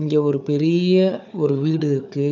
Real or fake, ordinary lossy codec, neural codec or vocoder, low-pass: fake; none; codec, 16 kHz, 4 kbps, FunCodec, trained on Chinese and English, 50 frames a second; 7.2 kHz